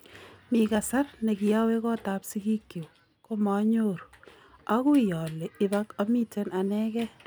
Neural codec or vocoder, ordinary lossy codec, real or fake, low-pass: none; none; real; none